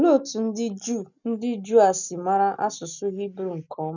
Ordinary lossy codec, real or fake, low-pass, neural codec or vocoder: none; real; 7.2 kHz; none